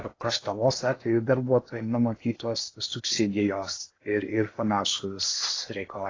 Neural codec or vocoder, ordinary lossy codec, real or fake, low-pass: codec, 16 kHz in and 24 kHz out, 0.8 kbps, FocalCodec, streaming, 65536 codes; AAC, 32 kbps; fake; 7.2 kHz